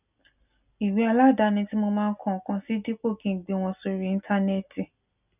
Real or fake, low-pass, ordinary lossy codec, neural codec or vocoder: real; 3.6 kHz; none; none